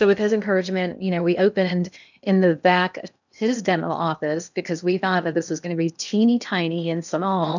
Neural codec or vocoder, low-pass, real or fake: codec, 16 kHz in and 24 kHz out, 0.8 kbps, FocalCodec, streaming, 65536 codes; 7.2 kHz; fake